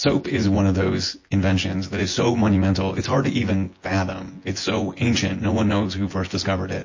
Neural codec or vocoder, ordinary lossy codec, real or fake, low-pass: vocoder, 24 kHz, 100 mel bands, Vocos; MP3, 32 kbps; fake; 7.2 kHz